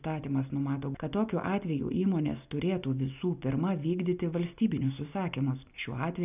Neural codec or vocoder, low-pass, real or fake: none; 3.6 kHz; real